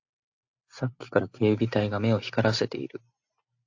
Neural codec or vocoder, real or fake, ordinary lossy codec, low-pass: none; real; AAC, 48 kbps; 7.2 kHz